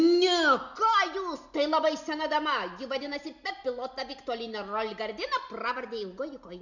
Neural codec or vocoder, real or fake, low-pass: none; real; 7.2 kHz